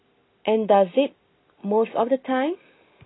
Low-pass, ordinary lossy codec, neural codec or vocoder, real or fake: 7.2 kHz; AAC, 16 kbps; none; real